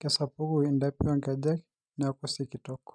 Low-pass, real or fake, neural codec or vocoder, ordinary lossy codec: 9.9 kHz; real; none; none